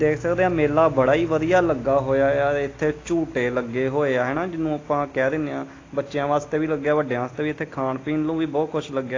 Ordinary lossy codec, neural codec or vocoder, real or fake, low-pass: AAC, 32 kbps; none; real; 7.2 kHz